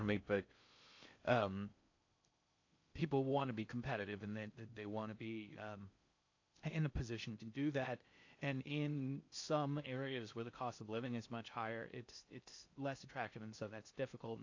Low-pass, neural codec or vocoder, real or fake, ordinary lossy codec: 7.2 kHz; codec, 16 kHz in and 24 kHz out, 0.6 kbps, FocalCodec, streaming, 4096 codes; fake; AAC, 48 kbps